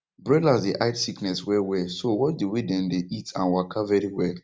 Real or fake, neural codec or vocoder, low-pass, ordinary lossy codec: real; none; none; none